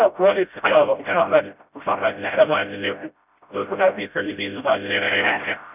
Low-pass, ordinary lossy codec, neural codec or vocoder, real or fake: 3.6 kHz; none; codec, 16 kHz, 0.5 kbps, FreqCodec, smaller model; fake